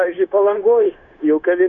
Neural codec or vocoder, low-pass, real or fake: codec, 16 kHz, 0.9 kbps, LongCat-Audio-Codec; 7.2 kHz; fake